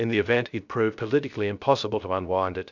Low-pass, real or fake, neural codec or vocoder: 7.2 kHz; fake; codec, 16 kHz, 0.3 kbps, FocalCodec